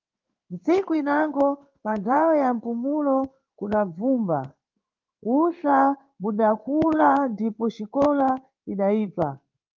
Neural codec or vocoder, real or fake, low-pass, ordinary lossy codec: codec, 16 kHz in and 24 kHz out, 1 kbps, XY-Tokenizer; fake; 7.2 kHz; Opus, 24 kbps